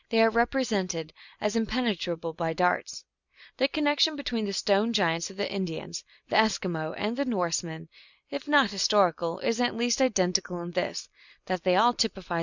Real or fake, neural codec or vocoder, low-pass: real; none; 7.2 kHz